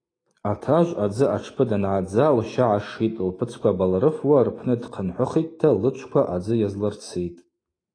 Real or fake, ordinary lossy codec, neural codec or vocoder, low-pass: fake; AAC, 32 kbps; autoencoder, 48 kHz, 128 numbers a frame, DAC-VAE, trained on Japanese speech; 9.9 kHz